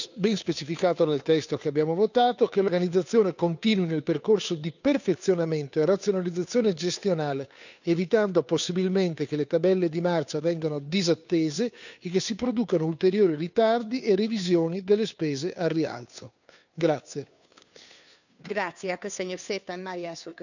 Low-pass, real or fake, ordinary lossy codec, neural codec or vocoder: 7.2 kHz; fake; none; codec, 16 kHz, 2 kbps, FunCodec, trained on Chinese and English, 25 frames a second